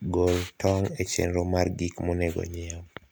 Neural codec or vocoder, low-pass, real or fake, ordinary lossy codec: none; none; real; none